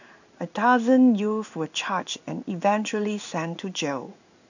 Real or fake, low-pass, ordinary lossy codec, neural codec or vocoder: fake; 7.2 kHz; none; codec, 16 kHz in and 24 kHz out, 1 kbps, XY-Tokenizer